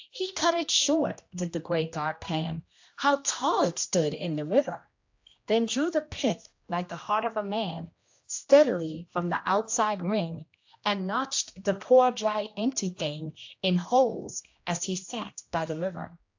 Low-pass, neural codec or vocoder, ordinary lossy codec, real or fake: 7.2 kHz; codec, 16 kHz, 1 kbps, X-Codec, HuBERT features, trained on general audio; AAC, 48 kbps; fake